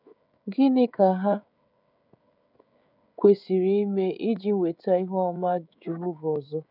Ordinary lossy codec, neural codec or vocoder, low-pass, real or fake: none; codec, 16 kHz, 16 kbps, FreqCodec, smaller model; 5.4 kHz; fake